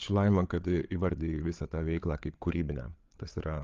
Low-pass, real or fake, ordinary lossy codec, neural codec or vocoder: 7.2 kHz; fake; Opus, 32 kbps; codec, 16 kHz, 8 kbps, FreqCodec, larger model